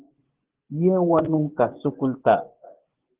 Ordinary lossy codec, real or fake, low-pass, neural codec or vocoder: Opus, 16 kbps; fake; 3.6 kHz; vocoder, 24 kHz, 100 mel bands, Vocos